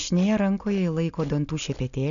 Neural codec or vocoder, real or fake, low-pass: none; real; 7.2 kHz